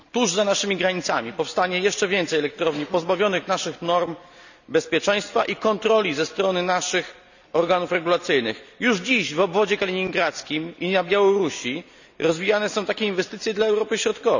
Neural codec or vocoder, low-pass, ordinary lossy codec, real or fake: none; 7.2 kHz; none; real